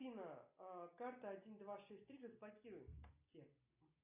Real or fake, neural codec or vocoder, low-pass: real; none; 3.6 kHz